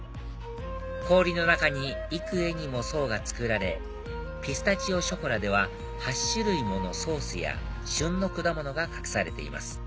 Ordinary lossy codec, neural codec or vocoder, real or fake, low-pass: none; none; real; none